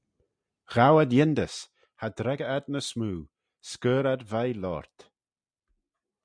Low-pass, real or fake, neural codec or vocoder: 9.9 kHz; real; none